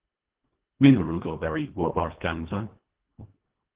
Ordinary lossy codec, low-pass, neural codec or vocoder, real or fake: Opus, 16 kbps; 3.6 kHz; codec, 24 kHz, 1.5 kbps, HILCodec; fake